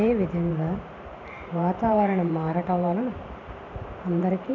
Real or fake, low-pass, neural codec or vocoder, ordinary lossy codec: fake; 7.2 kHz; vocoder, 44.1 kHz, 128 mel bands every 512 samples, BigVGAN v2; none